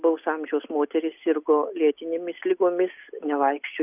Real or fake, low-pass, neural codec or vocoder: real; 3.6 kHz; none